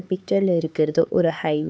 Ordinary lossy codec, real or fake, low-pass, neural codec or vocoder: none; fake; none; codec, 16 kHz, 4 kbps, X-Codec, HuBERT features, trained on LibriSpeech